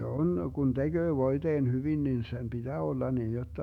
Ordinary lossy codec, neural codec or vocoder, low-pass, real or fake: none; autoencoder, 48 kHz, 128 numbers a frame, DAC-VAE, trained on Japanese speech; 19.8 kHz; fake